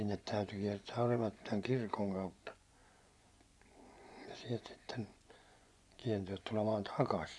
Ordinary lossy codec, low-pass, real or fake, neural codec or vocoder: none; none; fake; vocoder, 24 kHz, 100 mel bands, Vocos